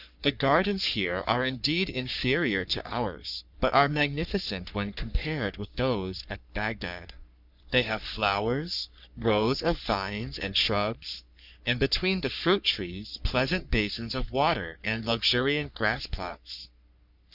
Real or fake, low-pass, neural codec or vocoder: fake; 5.4 kHz; codec, 44.1 kHz, 3.4 kbps, Pupu-Codec